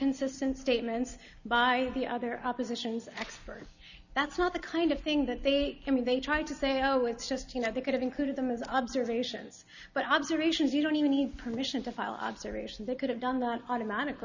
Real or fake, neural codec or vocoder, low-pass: real; none; 7.2 kHz